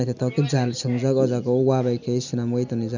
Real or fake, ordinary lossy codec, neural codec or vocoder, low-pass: real; none; none; 7.2 kHz